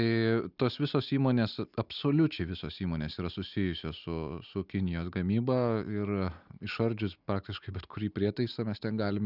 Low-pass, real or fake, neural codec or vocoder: 5.4 kHz; real; none